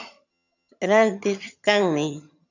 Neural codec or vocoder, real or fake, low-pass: vocoder, 22.05 kHz, 80 mel bands, HiFi-GAN; fake; 7.2 kHz